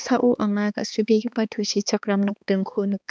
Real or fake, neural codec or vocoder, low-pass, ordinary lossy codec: fake; codec, 16 kHz, 2 kbps, X-Codec, HuBERT features, trained on balanced general audio; none; none